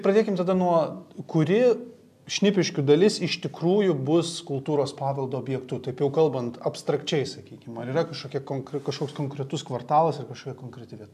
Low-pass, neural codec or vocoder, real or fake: 14.4 kHz; none; real